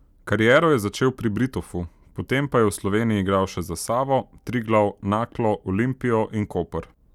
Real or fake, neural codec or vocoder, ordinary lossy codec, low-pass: real; none; none; 19.8 kHz